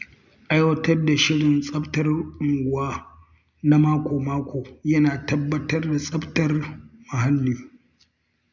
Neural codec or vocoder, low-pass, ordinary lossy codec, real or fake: none; 7.2 kHz; none; real